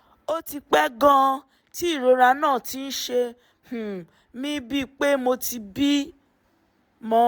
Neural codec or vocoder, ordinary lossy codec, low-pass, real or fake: none; none; none; real